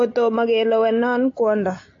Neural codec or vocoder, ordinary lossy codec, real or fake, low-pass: none; AAC, 48 kbps; real; 7.2 kHz